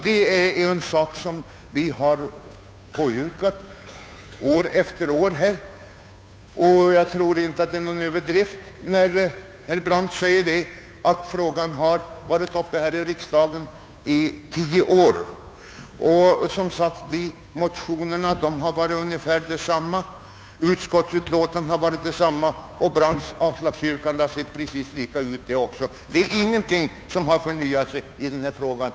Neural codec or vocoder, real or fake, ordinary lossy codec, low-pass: codec, 16 kHz, 2 kbps, FunCodec, trained on Chinese and English, 25 frames a second; fake; none; none